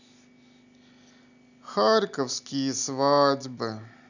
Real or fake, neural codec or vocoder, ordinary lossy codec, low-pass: real; none; none; 7.2 kHz